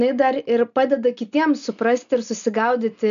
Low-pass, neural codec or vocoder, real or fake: 7.2 kHz; none; real